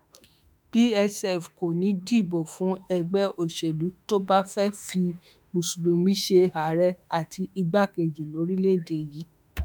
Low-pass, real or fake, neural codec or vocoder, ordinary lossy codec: none; fake; autoencoder, 48 kHz, 32 numbers a frame, DAC-VAE, trained on Japanese speech; none